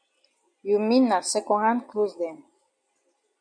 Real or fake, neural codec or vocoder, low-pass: real; none; 9.9 kHz